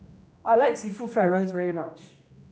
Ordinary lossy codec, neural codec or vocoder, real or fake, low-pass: none; codec, 16 kHz, 1 kbps, X-Codec, HuBERT features, trained on general audio; fake; none